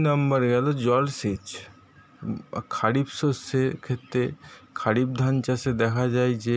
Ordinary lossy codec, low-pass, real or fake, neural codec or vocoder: none; none; real; none